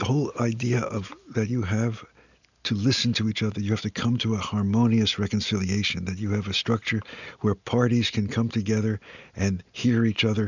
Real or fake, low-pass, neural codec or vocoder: real; 7.2 kHz; none